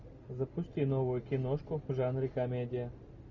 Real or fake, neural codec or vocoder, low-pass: real; none; 7.2 kHz